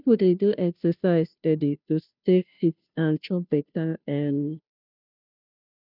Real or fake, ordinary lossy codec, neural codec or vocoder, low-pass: fake; none; codec, 16 kHz, 0.5 kbps, FunCodec, trained on Chinese and English, 25 frames a second; 5.4 kHz